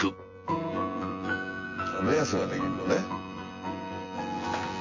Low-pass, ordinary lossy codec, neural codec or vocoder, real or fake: 7.2 kHz; MP3, 32 kbps; vocoder, 24 kHz, 100 mel bands, Vocos; fake